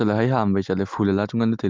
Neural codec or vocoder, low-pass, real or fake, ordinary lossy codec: none; 7.2 kHz; real; Opus, 32 kbps